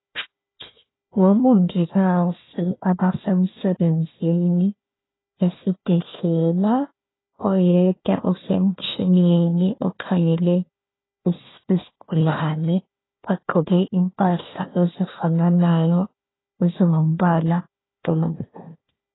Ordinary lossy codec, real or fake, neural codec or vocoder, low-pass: AAC, 16 kbps; fake; codec, 16 kHz, 1 kbps, FunCodec, trained on Chinese and English, 50 frames a second; 7.2 kHz